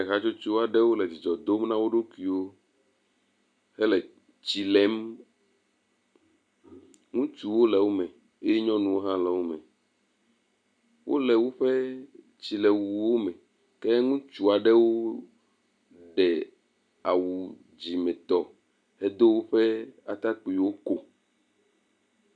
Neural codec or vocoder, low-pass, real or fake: none; 9.9 kHz; real